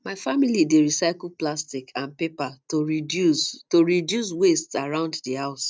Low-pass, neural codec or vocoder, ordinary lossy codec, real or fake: none; none; none; real